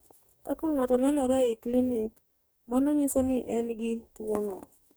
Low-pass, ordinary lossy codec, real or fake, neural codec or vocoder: none; none; fake; codec, 44.1 kHz, 2.6 kbps, DAC